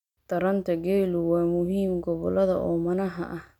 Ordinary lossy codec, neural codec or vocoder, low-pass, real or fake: none; none; 19.8 kHz; real